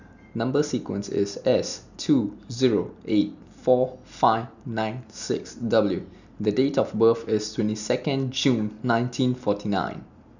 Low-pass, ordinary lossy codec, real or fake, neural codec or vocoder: 7.2 kHz; none; real; none